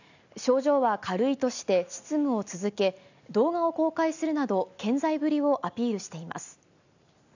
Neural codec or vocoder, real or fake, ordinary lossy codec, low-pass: none; real; none; 7.2 kHz